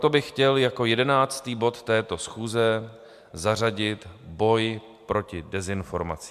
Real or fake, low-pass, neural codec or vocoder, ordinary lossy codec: real; 14.4 kHz; none; MP3, 96 kbps